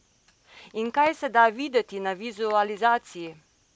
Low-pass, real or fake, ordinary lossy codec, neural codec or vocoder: none; real; none; none